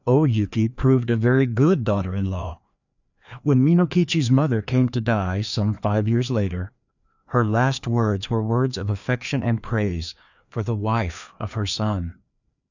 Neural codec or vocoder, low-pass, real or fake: codec, 16 kHz, 2 kbps, FreqCodec, larger model; 7.2 kHz; fake